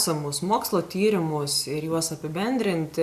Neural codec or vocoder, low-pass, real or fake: none; 14.4 kHz; real